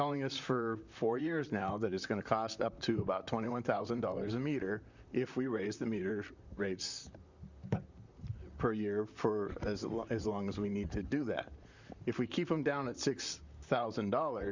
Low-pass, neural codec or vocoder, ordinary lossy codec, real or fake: 7.2 kHz; vocoder, 44.1 kHz, 128 mel bands, Pupu-Vocoder; Opus, 64 kbps; fake